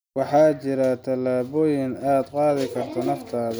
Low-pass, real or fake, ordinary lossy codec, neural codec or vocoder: none; real; none; none